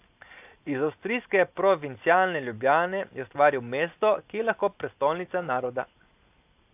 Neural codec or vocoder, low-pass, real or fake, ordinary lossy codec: none; 3.6 kHz; real; AAC, 32 kbps